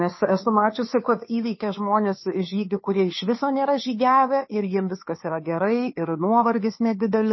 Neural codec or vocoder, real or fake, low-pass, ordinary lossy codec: codec, 16 kHz, 2 kbps, FunCodec, trained on Chinese and English, 25 frames a second; fake; 7.2 kHz; MP3, 24 kbps